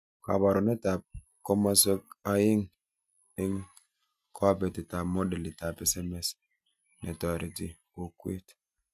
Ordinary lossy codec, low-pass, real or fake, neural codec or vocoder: MP3, 96 kbps; 14.4 kHz; real; none